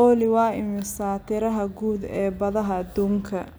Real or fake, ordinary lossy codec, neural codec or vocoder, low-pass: real; none; none; none